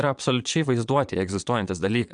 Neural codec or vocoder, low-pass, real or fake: vocoder, 22.05 kHz, 80 mel bands, Vocos; 9.9 kHz; fake